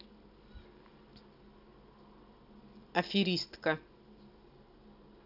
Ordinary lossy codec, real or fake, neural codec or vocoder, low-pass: none; real; none; 5.4 kHz